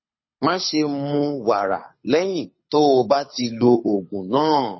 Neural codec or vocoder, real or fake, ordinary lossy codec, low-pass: codec, 24 kHz, 6 kbps, HILCodec; fake; MP3, 24 kbps; 7.2 kHz